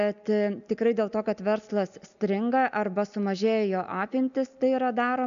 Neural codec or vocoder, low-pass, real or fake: none; 7.2 kHz; real